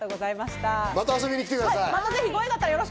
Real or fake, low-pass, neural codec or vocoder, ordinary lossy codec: real; none; none; none